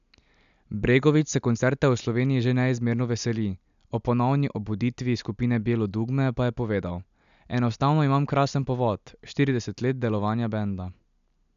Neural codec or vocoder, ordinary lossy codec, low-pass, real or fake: none; none; 7.2 kHz; real